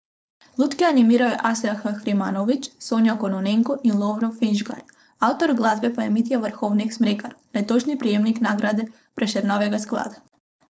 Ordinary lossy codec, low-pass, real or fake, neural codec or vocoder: none; none; fake; codec, 16 kHz, 4.8 kbps, FACodec